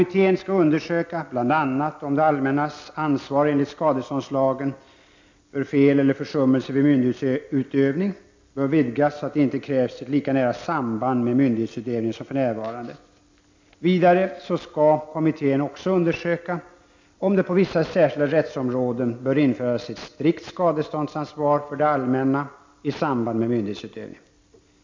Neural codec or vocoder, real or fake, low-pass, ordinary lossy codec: none; real; 7.2 kHz; MP3, 48 kbps